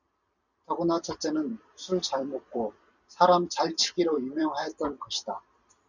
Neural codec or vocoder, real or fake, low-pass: vocoder, 44.1 kHz, 128 mel bands every 256 samples, BigVGAN v2; fake; 7.2 kHz